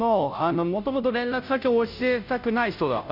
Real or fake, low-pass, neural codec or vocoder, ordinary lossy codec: fake; 5.4 kHz; codec, 16 kHz, 0.5 kbps, FunCodec, trained on Chinese and English, 25 frames a second; none